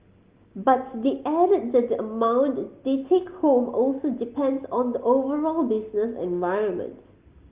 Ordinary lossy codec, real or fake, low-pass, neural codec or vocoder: Opus, 32 kbps; real; 3.6 kHz; none